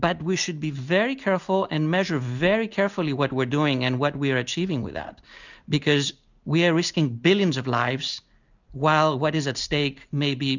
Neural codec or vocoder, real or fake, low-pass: none; real; 7.2 kHz